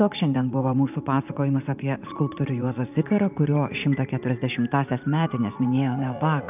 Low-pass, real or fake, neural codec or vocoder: 3.6 kHz; fake; vocoder, 44.1 kHz, 80 mel bands, Vocos